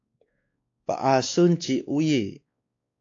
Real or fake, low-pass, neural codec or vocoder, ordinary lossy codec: fake; 7.2 kHz; codec, 16 kHz, 2 kbps, X-Codec, WavLM features, trained on Multilingual LibriSpeech; AAC, 48 kbps